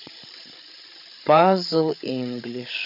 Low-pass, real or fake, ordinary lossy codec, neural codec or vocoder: 5.4 kHz; fake; none; codec, 16 kHz, 8 kbps, FreqCodec, larger model